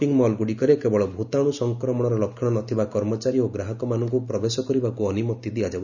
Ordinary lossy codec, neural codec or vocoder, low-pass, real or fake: none; none; 7.2 kHz; real